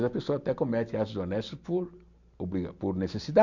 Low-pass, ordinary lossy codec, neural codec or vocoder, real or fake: 7.2 kHz; none; none; real